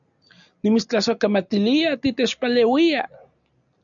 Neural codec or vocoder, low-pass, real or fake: none; 7.2 kHz; real